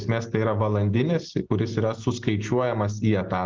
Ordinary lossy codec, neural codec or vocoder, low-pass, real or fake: Opus, 16 kbps; none; 7.2 kHz; real